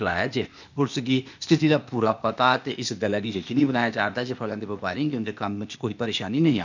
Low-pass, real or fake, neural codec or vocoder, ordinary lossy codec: 7.2 kHz; fake; codec, 16 kHz, 0.8 kbps, ZipCodec; none